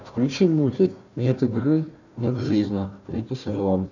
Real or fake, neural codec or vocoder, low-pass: fake; codec, 16 kHz, 1 kbps, FunCodec, trained on Chinese and English, 50 frames a second; 7.2 kHz